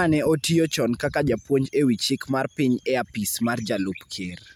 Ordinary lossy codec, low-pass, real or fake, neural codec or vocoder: none; none; real; none